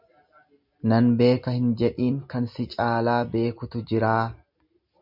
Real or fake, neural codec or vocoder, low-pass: real; none; 5.4 kHz